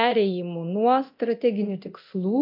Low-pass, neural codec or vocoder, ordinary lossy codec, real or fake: 5.4 kHz; codec, 24 kHz, 0.9 kbps, DualCodec; MP3, 48 kbps; fake